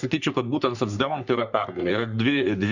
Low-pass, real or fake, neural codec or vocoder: 7.2 kHz; fake; codec, 44.1 kHz, 3.4 kbps, Pupu-Codec